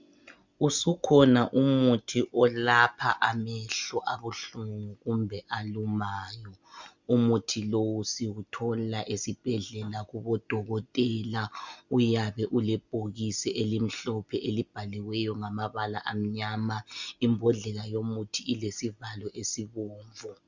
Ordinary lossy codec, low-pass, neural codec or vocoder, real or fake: Opus, 64 kbps; 7.2 kHz; none; real